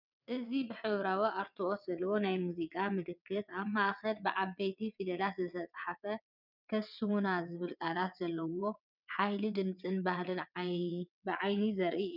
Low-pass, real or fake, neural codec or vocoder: 5.4 kHz; fake; vocoder, 22.05 kHz, 80 mel bands, WaveNeXt